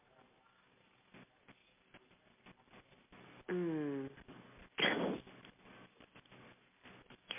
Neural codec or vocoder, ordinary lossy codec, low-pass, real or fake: none; MP3, 32 kbps; 3.6 kHz; real